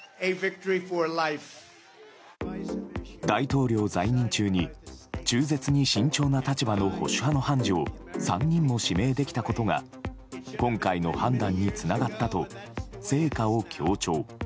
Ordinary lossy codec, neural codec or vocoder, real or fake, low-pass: none; none; real; none